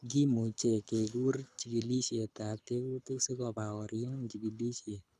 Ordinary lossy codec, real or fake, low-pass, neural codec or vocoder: none; fake; none; codec, 24 kHz, 6 kbps, HILCodec